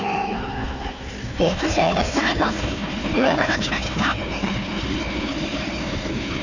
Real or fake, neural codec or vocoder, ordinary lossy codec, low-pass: fake; codec, 16 kHz, 1 kbps, FunCodec, trained on Chinese and English, 50 frames a second; none; 7.2 kHz